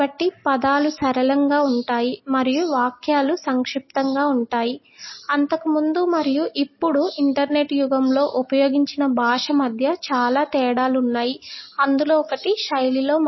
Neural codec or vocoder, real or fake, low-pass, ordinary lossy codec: none; real; 7.2 kHz; MP3, 24 kbps